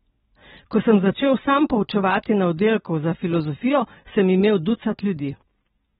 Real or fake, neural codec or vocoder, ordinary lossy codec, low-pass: fake; vocoder, 44.1 kHz, 128 mel bands every 256 samples, BigVGAN v2; AAC, 16 kbps; 19.8 kHz